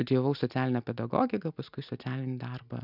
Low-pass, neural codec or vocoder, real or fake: 5.4 kHz; none; real